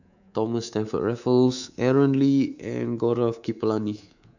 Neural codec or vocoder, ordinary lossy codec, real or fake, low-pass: codec, 24 kHz, 3.1 kbps, DualCodec; none; fake; 7.2 kHz